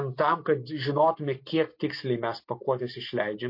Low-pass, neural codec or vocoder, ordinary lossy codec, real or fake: 5.4 kHz; none; MP3, 32 kbps; real